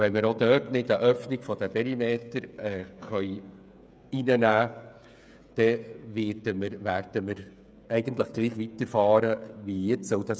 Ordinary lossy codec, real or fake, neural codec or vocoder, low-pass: none; fake; codec, 16 kHz, 16 kbps, FreqCodec, smaller model; none